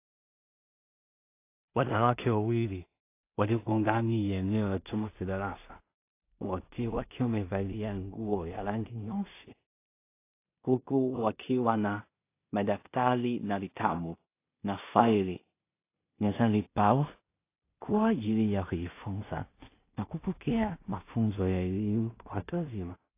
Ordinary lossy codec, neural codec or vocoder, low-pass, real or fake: AAC, 24 kbps; codec, 16 kHz in and 24 kHz out, 0.4 kbps, LongCat-Audio-Codec, two codebook decoder; 3.6 kHz; fake